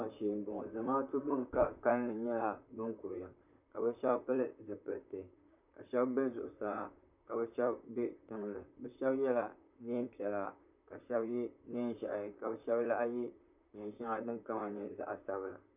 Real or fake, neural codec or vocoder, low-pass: fake; vocoder, 44.1 kHz, 128 mel bands, Pupu-Vocoder; 3.6 kHz